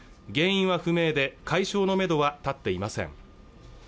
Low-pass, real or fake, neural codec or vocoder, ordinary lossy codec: none; real; none; none